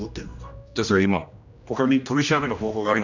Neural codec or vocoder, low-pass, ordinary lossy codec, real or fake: codec, 16 kHz, 1 kbps, X-Codec, HuBERT features, trained on general audio; 7.2 kHz; none; fake